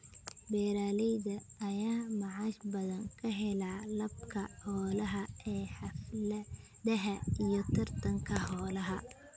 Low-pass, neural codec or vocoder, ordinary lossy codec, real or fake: none; none; none; real